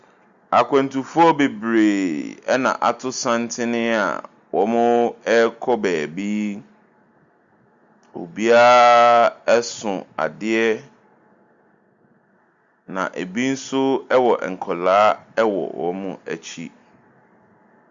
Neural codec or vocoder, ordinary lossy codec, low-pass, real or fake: none; Opus, 64 kbps; 7.2 kHz; real